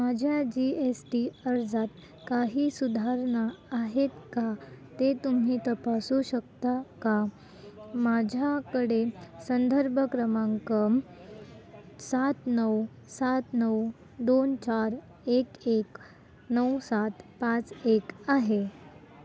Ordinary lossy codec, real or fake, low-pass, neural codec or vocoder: none; real; none; none